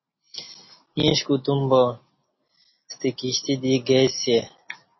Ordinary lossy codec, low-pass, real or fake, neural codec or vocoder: MP3, 24 kbps; 7.2 kHz; real; none